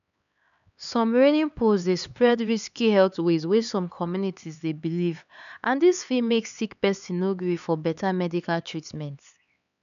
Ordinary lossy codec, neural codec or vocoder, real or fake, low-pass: none; codec, 16 kHz, 4 kbps, X-Codec, HuBERT features, trained on LibriSpeech; fake; 7.2 kHz